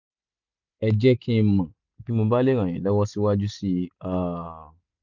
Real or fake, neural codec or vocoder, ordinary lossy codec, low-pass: real; none; none; 7.2 kHz